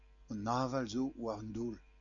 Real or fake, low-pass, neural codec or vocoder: real; 7.2 kHz; none